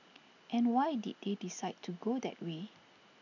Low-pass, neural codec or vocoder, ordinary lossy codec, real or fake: 7.2 kHz; none; none; real